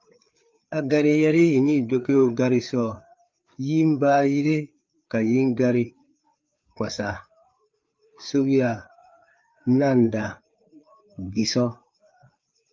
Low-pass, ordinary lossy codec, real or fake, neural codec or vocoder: 7.2 kHz; Opus, 24 kbps; fake; codec, 16 kHz, 4 kbps, FreqCodec, larger model